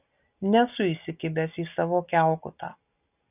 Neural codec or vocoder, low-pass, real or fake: none; 3.6 kHz; real